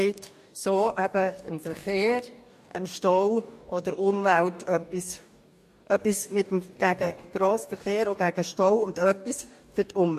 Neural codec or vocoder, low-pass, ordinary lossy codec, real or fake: codec, 44.1 kHz, 2.6 kbps, DAC; 14.4 kHz; MP3, 64 kbps; fake